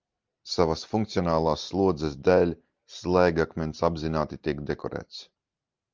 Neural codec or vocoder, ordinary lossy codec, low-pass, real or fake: none; Opus, 24 kbps; 7.2 kHz; real